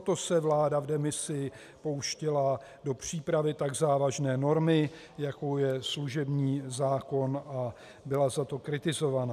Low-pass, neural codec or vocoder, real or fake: 14.4 kHz; none; real